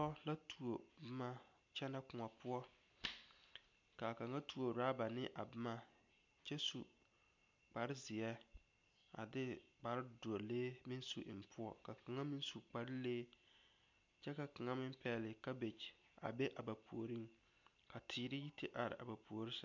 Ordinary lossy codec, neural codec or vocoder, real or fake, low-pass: MP3, 64 kbps; none; real; 7.2 kHz